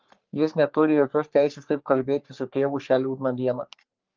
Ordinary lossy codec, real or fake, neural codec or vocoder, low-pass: Opus, 24 kbps; fake; codec, 44.1 kHz, 3.4 kbps, Pupu-Codec; 7.2 kHz